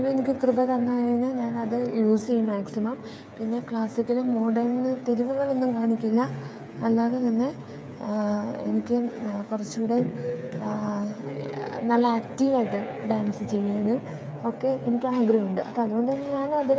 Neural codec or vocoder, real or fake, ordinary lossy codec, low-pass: codec, 16 kHz, 8 kbps, FreqCodec, smaller model; fake; none; none